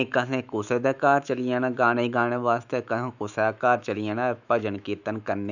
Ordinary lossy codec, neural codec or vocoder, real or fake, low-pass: none; autoencoder, 48 kHz, 128 numbers a frame, DAC-VAE, trained on Japanese speech; fake; 7.2 kHz